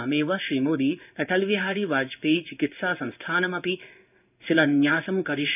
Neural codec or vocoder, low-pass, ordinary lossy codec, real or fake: codec, 16 kHz in and 24 kHz out, 1 kbps, XY-Tokenizer; 3.6 kHz; none; fake